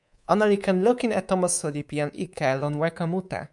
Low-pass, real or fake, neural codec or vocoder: 10.8 kHz; fake; codec, 24 kHz, 3.1 kbps, DualCodec